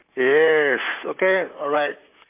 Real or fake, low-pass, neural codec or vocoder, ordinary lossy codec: fake; 3.6 kHz; vocoder, 44.1 kHz, 128 mel bands, Pupu-Vocoder; MP3, 32 kbps